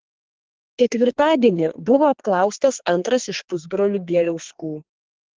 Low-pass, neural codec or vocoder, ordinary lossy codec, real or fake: 7.2 kHz; codec, 32 kHz, 1.9 kbps, SNAC; Opus, 16 kbps; fake